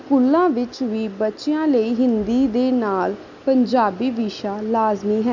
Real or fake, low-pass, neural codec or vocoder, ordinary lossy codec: real; 7.2 kHz; none; none